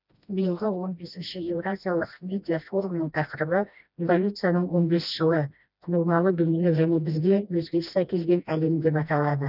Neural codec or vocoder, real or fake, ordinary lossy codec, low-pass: codec, 16 kHz, 1 kbps, FreqCodec, smaller model; fake; none; 5.4 kHz